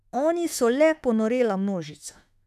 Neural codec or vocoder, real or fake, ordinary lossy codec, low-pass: autoencoder, 48 kHz, 32 numbers a frame, DAC-VAE, trained on Japanese speech; fake; none; 14.4 kHz